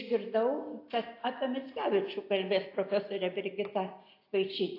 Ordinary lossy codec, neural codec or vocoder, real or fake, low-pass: AAC, 32 kbps; none; real; 5.4 kHz